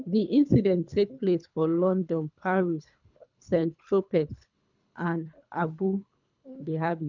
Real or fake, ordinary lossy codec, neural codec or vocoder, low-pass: fake; none; codec, 24 kHz, 3 kbps, HILCodec; 7.2 kHz